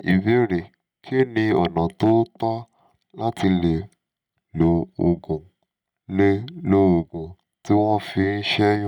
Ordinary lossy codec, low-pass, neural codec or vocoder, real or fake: none; 19.8 kHz; none; real